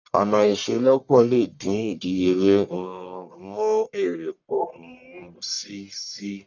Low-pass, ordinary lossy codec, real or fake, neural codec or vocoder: 7.2 kHz; none; fake; codec, 44.1 kHz, 1.7 kbps, Pupu-Codec